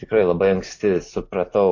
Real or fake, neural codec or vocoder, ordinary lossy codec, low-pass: real; none; AAC, 48 kbps; 7.2 kHz